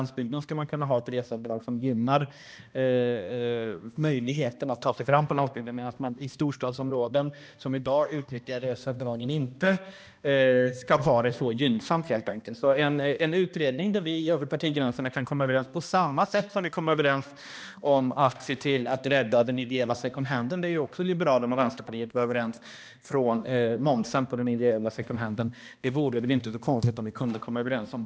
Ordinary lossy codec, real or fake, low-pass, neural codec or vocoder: none; fake; none; codec, 16 kHz, 1 kbps, X-Codec, HuBERT features, trained on balanced general audio